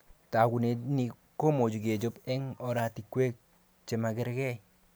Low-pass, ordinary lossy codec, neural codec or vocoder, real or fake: none; none; none; real